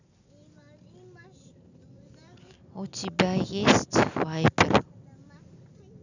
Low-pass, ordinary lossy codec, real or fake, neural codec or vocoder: 7.2 kHz; none; real; none